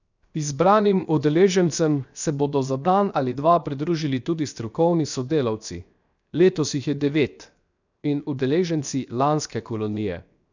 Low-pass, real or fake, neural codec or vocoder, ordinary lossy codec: 7.2 kHz; fake; codec, 16 kHz, 0.7 kbps, FocalCodec; none